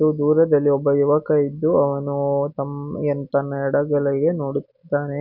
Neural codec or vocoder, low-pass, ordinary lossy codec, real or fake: none; 5.4 kHz; none; real